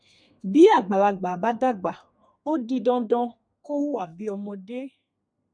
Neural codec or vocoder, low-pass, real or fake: codec, 32 kHz, 1.9 kbps, SNAC; 9.9 kHz; fake